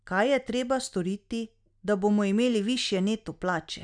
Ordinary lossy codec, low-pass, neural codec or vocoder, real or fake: none; 9.9 kHz; none; real